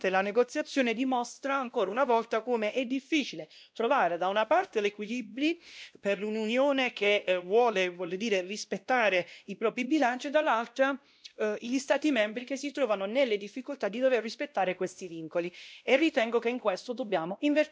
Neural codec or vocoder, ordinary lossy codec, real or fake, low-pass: codec, 16 kHz, 1 kbps, X-Codec, WavLM features, trained on Multilingual LibriSpeech; none; fake; none